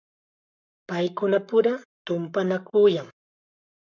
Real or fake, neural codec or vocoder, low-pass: fake; codec, 44.1 kHz, 7.8 kbps, Pupu-Codec; 7.2 kHz